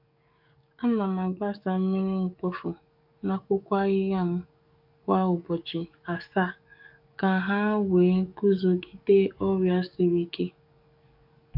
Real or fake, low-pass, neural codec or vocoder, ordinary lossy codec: fake; 5.4 kHz; codec, 44.1 kHz, 7.8 kbps, DAC; none